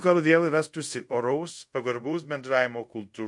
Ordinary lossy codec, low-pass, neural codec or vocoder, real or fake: MP3, 48 kbps; 10.8 kHz; codec, 24 kHz, 0.5 kbps, DualCodec; fake